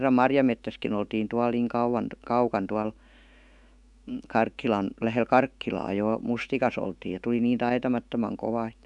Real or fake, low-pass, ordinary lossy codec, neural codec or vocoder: fake; 10.8 kHz; none; autoencoder, 48 kHz, 128 numbers a frame, DAC-VAE, trained on Japanese speech